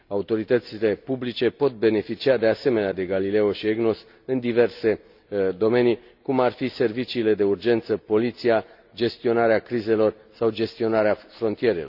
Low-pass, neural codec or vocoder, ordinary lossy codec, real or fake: 5.4 kHz; none; none; real